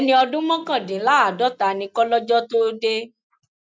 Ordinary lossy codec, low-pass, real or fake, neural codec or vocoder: none; none; real; none